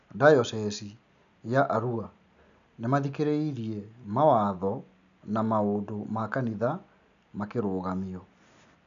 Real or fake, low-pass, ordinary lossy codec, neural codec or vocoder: real; 7.2 kHz; none; none